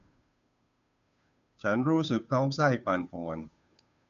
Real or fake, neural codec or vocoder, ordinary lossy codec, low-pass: fake; codec, 16 kHz, 2 kbps, FunCodec, trained on Chinese and English, 25 frames a second; none; 7.2 kHz